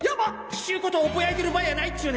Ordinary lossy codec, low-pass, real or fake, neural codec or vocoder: none; none; real; none